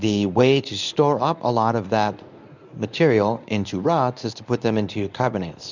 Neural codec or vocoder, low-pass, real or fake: codec, 24 kHz, 0.9 kbps, WavTokenizer, medium speech release version 1; 7.2 kHz; fake